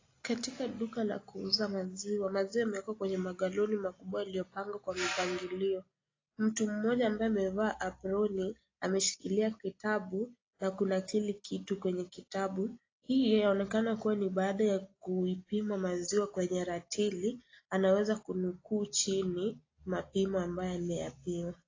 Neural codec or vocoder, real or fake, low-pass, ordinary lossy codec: none; real; 7.2 kHz; AAC, 32 kbps